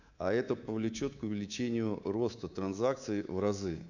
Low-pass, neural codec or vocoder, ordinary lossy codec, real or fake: 7.2 kHz; codec, 24 kHz, 3.1 kbps, DualCodec; none; fake